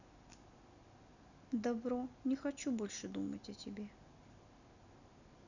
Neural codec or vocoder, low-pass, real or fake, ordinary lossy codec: none; 7.2 kHz; real; none